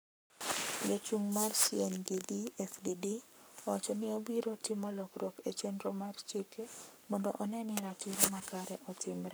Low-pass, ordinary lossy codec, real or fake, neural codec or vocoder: none; none; fake; codec, 44.1 kHz, 7.8 kbps, Pupu-Codec